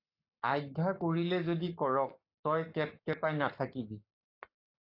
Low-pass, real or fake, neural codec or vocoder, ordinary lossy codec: 5.4 kHz; fake; codec, 44.1 kHz, 7.8 kbps, Pupu-Codec; AAC, 32 kbps